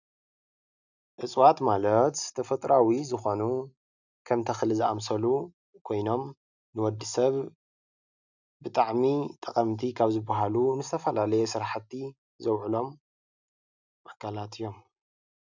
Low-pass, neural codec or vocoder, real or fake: 7.2 kHz; none; real